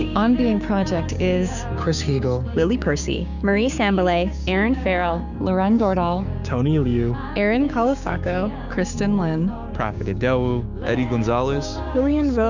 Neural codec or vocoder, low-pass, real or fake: codec, 16 kHz, 6 kbps, DAC; 7.2 kHz; fake